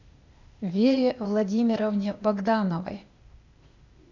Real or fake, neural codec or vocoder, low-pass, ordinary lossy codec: fake; codec, 16 kHz, 0.8 kbps, ZipCodec; 7.2 kHz; Opus, 64 kbps